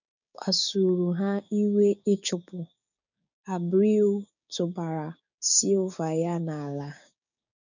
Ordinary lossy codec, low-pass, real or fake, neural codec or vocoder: none; 7.2 kHz; fake; codec, 16 kHz, 6 kbps, DAC